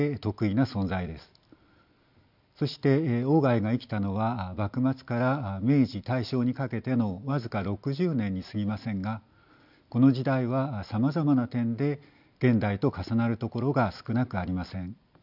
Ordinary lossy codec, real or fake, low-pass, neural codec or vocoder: none; real; 5.4 kHz; none